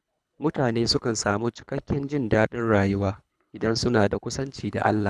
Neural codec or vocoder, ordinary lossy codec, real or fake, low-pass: codec, 24 kHz, 3 kbps, HILCodec; none; fake; none